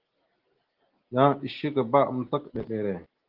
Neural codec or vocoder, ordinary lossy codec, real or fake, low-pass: none; Opus, 16 kbps; real; 5.4 kHz